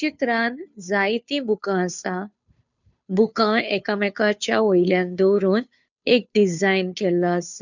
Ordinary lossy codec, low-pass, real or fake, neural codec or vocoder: none; 7.2 kHz; fake; codec, 16 kHz, 2 kbps, FunCodec, trained on Chinese and English, 25 frames a second